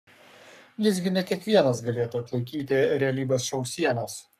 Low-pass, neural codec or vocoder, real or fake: 14.4 kHz; codec, 44.1 kHz, 3.4 kbps, Pupu-Codec; fake